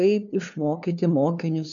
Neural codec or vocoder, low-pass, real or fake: codec, 16 kHz, 2 kbps, FunCodec, trained on LibriTTS, 25 frames a second; 7.2 kHz; fake